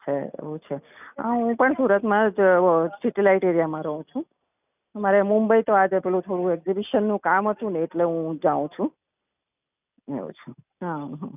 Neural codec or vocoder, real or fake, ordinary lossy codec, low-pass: none; real; none; 3.6 kHz